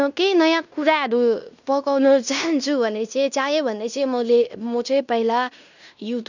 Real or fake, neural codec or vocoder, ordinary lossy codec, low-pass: fake; codec, 16 kHz in and 24 kHz out, 0.9 kbps, LongCat-Audio-Codec, fine tuned four codebook decoder; none; 7.2 kHz